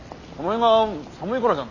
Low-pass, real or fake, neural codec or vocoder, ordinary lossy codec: 7.2 kHz; real; none; none